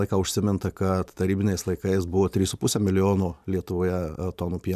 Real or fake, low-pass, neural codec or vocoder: fake; 14.4 kHz; vocoder, 44.1 kHz, 128 mel bands every 512 samples, BigVGAN v2